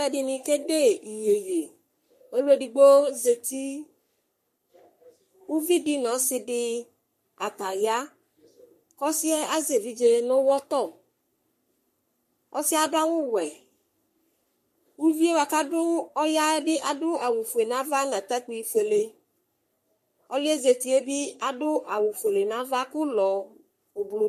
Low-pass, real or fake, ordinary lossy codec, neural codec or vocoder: 14.4 kHz; fake; MP3, 64 kbps; codec, 44.1 kHz, 3.4 kbps, Pupu-Codec